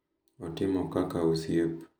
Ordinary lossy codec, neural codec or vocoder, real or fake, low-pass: none; none; real; none